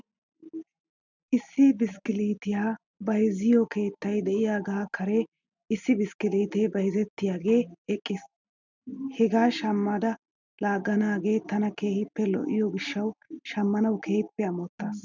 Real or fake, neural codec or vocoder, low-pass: real; none; 7.2 kHz